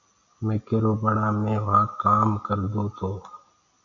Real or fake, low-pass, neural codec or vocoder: real; 7.2 kHz; none